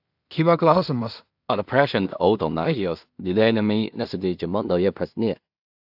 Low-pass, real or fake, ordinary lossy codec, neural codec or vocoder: 5.4 kHz; fake; AAC, 48 kbps; codec, 16 kHz in and 24 kHz out, 0.4 kbps, LongCat-Audio-Codec, two codebook decoder